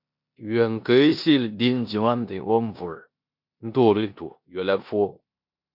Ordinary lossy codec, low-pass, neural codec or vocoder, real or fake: MP3, 48 kbps; 5.4 kHz; codec, 16 kHz in and 24 kHz out, 0.9 kbps, LongCat-Audio-Codec, four codebook decoder; fake